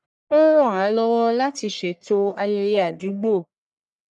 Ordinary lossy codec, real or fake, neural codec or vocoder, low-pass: none; fake; codec, 44.1 kHz, 1.7 kbps, Pupu-Codec; 10.8 kHz